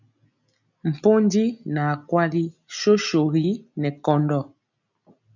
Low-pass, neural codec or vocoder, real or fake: 7.2 kHz; none; real